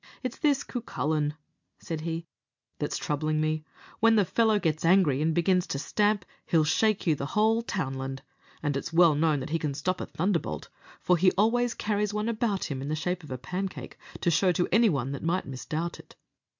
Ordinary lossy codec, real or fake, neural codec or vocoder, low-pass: MP3, 64 kbps; real; none; 7.2 kHz